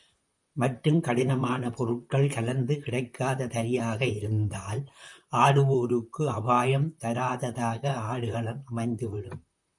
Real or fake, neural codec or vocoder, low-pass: fake; vocoder, 44.1 kHz, 128 mel bands, Pupu-Vocoder; 10.8 kHz